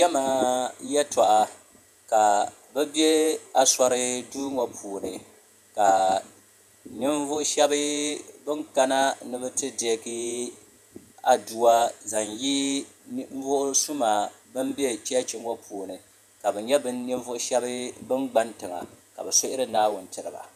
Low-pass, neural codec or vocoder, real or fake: 14.4 kHz; vocoder, 48 kHz, 128 mel bands, Vocos; fake